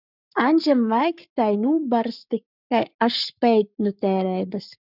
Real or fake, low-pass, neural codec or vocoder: fake; 5.4 kHz; codec, 44.1 kHz, 7.8 kbps, Pupu-Codec